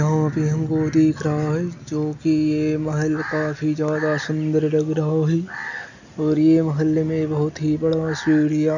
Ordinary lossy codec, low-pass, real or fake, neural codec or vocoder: none; 7.2 kHz; real; none